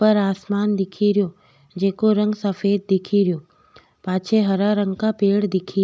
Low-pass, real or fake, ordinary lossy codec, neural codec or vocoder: none; real; none; none